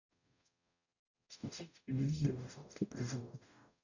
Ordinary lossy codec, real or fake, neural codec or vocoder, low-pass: none; fake; codec, 44.1 kHz, 0.9 kbps, DAC; 7.2 kHz